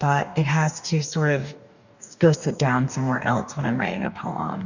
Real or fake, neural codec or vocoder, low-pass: fake; codec, 44.1 kHz, 2.6 kbps, DAC; 7.2 kHz